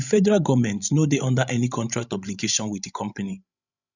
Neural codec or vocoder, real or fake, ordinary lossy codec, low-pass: codec, 16 kHz, 16 kbps, FreqCodec, larger model; fake; none; 7.2 kHz